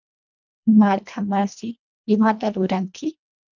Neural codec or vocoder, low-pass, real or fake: codec, 24 kHz, 1.5 kbps, HILCodec; 7.2 kHz; fake